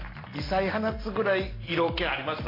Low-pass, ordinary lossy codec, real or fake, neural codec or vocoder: 5.4 kHz; AAC, 24 kbps; real; none